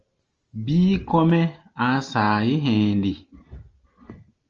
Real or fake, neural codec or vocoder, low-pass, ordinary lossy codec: real; none; 7.2 kHz; Opus, 24 kbps